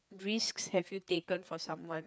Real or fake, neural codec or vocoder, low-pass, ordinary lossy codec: fake; codec, 16 kHz, 4 kbps, FreqCodec, larger model; none; none